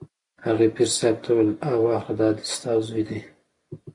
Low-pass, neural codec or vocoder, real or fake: 10.8 kHz; none; real